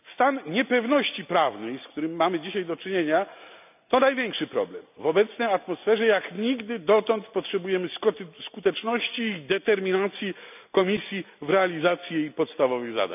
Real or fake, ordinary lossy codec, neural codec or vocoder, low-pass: real; none; none; 3.6 kHz